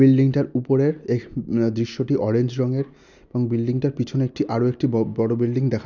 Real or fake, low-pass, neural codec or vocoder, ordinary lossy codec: real; 7.2 kHz; none; none